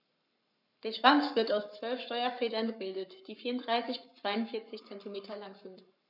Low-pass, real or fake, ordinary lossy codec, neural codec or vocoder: 5.4 kHz; fake; none; codec, 44.1 kHz, 7.8 kbps, Pupu-Codec